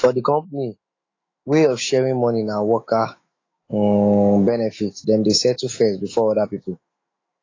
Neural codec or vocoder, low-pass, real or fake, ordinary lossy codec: autoencoder, 48 kHz, 128 numbers a frame, DAC-VAE, trained on Japanese speech; 7.2 kHz; fake; AAC, 32 kbps